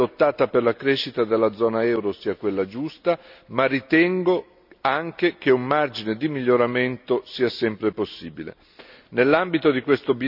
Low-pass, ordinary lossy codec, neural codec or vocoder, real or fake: 5.4 kHz; none; none; real